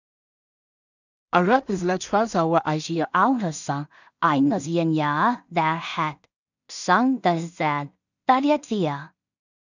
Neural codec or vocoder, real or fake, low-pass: codec, 16 kHz in and 24 kHz out, 0.4 kbps, LongCat-Audio-Codec, two codebook decoder; fake; 7.2 kHz